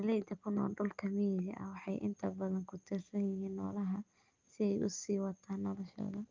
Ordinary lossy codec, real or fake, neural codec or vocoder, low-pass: Opus, 24 kbps; real; none; 7.2 kHz